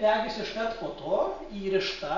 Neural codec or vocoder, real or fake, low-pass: none; real; 7.2 kHz